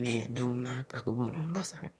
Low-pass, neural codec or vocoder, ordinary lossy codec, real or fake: none; autoencoder, 22.05 kHz, a latent of 192 numbers a frame, VITS, trained on one speaker; none; fake